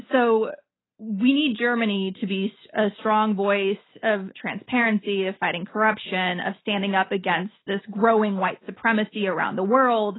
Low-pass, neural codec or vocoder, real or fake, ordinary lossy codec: 7.2 kHz; none; real; AAC, 16 kbps